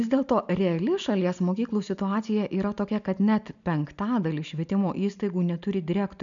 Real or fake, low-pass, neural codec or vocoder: real; 7.2 kHz; none